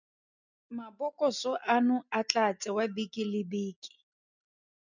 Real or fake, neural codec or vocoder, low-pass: real; none; 7.2 kHz